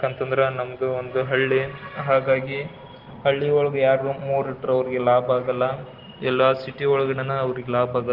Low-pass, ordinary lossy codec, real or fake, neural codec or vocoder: 5.4 kHz; Opus, 16 kbps; real; none